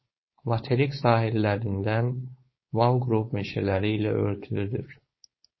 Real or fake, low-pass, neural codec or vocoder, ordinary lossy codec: fake; 7.2 kHz; codec, 16 kHz, 4.8 kbps, FACodec; MP3, 24 kbps